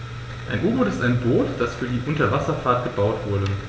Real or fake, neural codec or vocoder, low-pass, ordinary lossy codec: real; none; none; none